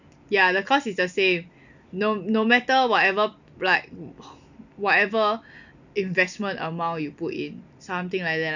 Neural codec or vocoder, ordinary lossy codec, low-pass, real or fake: none; none; 7.2 kHz; real